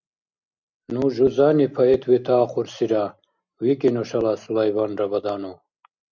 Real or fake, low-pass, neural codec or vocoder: real; 7.2 kHz; none